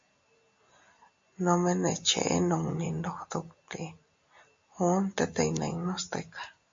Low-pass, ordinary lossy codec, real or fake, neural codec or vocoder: 7.2 kHz; MP3, 48 kbps; real; none